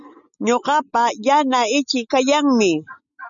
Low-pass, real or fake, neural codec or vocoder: 7.2 kHz; real; none